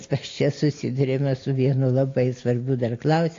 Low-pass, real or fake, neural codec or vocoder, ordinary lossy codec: 7.2 kHz; real; none; AAC, 32 kbps